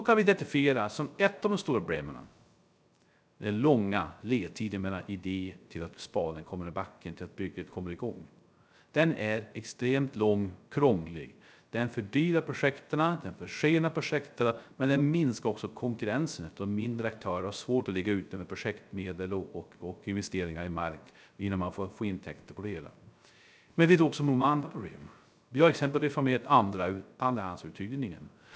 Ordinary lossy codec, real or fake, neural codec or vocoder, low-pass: none; fake; codec, 16 kHz, 0.3 kbps, FocalCodec; none